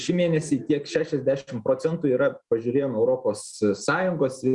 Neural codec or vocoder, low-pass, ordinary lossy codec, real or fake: none; 10.8 kHz; Opus, 64 kbps; real